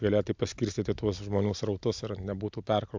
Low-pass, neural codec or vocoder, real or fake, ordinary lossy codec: 7.2 kHz; none; real; MP3, 64 kbps